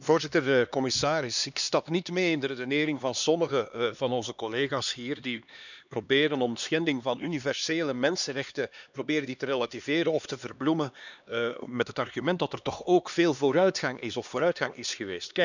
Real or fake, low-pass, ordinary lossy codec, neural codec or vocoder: fake; 7.2 kHz; none; codec, 16 kHz, 2 kbps, X-Codec, HuBERT features, trained on LibriSpeech